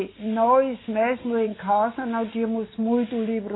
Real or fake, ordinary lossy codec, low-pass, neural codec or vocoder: real; AAC, 16 kbps; 7.2 kHz; none